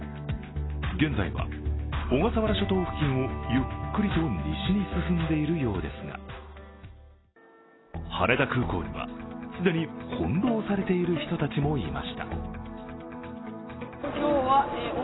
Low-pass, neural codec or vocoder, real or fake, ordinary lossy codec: 7.2 kHz; none; real; AAC, 16 kbps